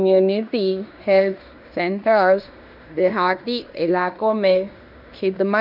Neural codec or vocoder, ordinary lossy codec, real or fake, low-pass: codec, 16 kHz in and 24 kHz out, 0.9 kbps, LongCat-Audio-Codec, fine tuned four codebook decoder; none; fake; 5.4 kHz